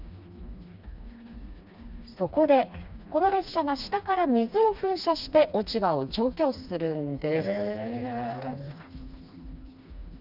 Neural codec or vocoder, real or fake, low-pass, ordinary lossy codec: codec, 16 kHz, 2 kbps, FreqCodec, smaller model; fake; 5.4 kHz; none